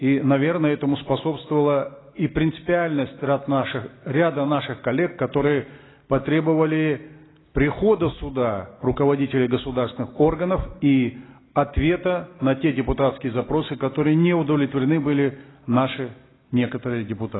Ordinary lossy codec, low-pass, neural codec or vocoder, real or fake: AAC, 16 kbps; 7.2 kHz; none; real